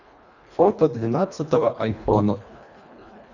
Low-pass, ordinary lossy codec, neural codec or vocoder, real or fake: 7.2 kHz; none; codec, 24 kHz, 1.5 kbps, HILCodec; fake